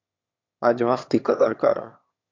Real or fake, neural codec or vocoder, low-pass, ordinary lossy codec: fake; autoencoder, 22.05 kHz, a latent of 192 numbers a frame, VITS, trained on one speaker; 7.2 kHz; MP3, 48 kbps